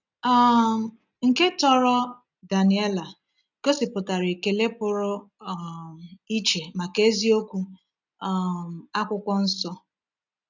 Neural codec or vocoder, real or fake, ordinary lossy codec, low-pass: none; real; none; 7.2 kHz